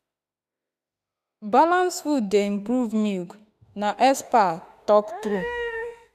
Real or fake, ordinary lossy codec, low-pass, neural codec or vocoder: fake; none; 14.4 kHz; autoencoder, 48 kHz, 32 numbers a frame, DAC-VAE, trained on Japanese speech